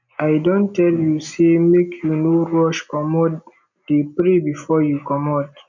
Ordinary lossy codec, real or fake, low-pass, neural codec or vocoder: none; real; 7.2 kHz; none